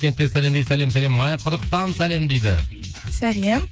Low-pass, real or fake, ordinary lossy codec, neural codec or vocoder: none; fake; none; codec, 16 kHz, 4 kbps, FreqCodec, smaller model